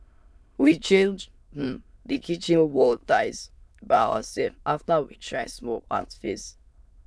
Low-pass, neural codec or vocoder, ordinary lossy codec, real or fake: none; autoencoder, 22.05 kHz, a latent of 192 numbers a frame, VITS, trained on many speakers; none; fake